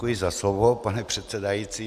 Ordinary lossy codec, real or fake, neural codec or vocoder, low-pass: MP3, 96 kbps; real; none; 14.4 kHz